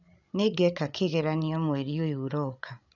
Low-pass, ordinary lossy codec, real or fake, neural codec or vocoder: 7.2 kHz; none; fake; codec, 16 kHz, 8 kbps, FreqCodec, larger model